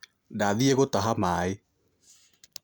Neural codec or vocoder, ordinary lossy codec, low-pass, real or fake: none; none; none; real